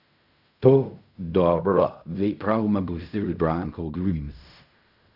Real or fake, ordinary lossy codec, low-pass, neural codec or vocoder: fake; none; 5.4 kHz; codec, 16 kHz in and 24 kHz out, 0.4 kbps, LongCat-Audio-Codec, fine tuned four codebook decoder